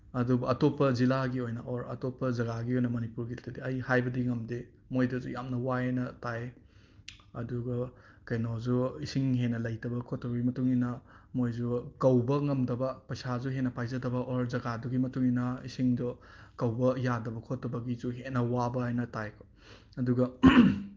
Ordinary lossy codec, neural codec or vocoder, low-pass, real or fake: Opus, 32 kbps; none; 7.2 kHz; real